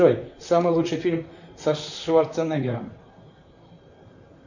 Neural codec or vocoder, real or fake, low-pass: vocoder, 44.1 kHz, 128 mel bands, Pupu-Vocoder; fake; 7.2 kHz